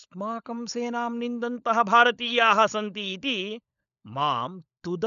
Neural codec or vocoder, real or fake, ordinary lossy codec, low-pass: codec, 16 kHz, 16 kbps, FunCodec, trained on LibriTTS, 50 frames a second; fake; none; 7.2 kHz